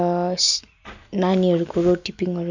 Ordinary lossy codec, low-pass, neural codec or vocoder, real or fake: none; 7.2 kHz; none; real